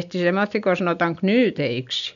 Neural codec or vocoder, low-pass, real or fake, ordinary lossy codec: none; 7.2 kHz; real; none